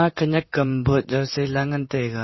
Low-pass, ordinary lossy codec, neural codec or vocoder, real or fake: 7.2 kHz; MP3, 24 kbps; vocoder, 44.1 kHz, 128 mel bands, Pupu-Vocoder; fake